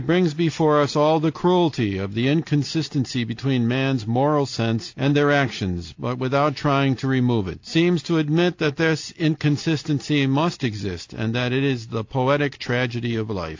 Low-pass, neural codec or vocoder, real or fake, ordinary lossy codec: 7.2 kHz; none; real; AAC, 48 kbps